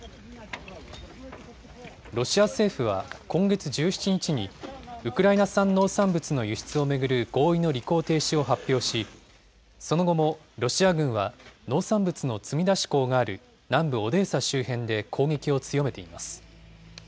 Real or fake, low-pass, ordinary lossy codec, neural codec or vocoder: real; none; none; none